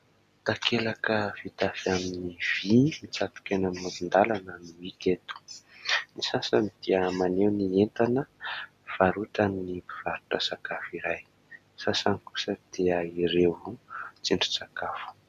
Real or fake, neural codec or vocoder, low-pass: real; none; 14.4 kHz